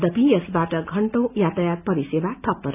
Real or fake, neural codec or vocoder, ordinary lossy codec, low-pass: real; none; none; 3.6 kHz